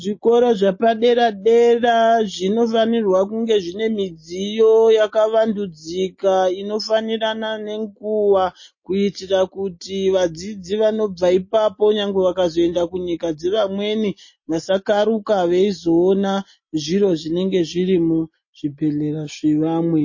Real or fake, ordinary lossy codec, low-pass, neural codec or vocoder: real; MP3, 32 kbps; 7.2 kHz; none